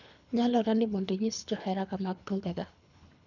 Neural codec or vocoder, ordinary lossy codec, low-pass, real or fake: codec, 24 kHz, 3 kbps, HILCodec; none; 7.2 kHz; fake